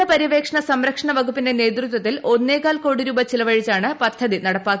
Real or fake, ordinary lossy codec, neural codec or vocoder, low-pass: real; none; none; none